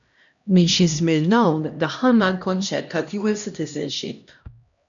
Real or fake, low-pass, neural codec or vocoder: fake; 7.2 kHz; codec, 16 kHz, 1 kbps, X-Codec, HuBERT features, trained on LibriSpeech